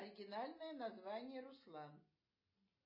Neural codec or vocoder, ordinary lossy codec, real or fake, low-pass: none; MP3, 24 kbps; real; 5.4 kHz